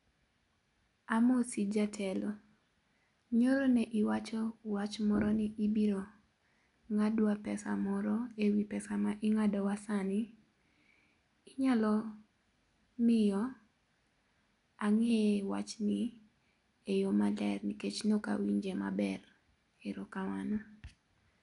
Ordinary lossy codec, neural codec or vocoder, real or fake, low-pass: none; none; real; 10.8 kHz